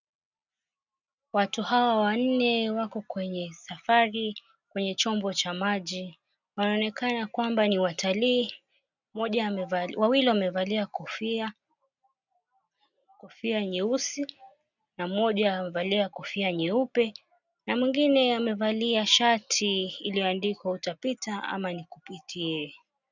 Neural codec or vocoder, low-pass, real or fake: none; 7.2 kHz; real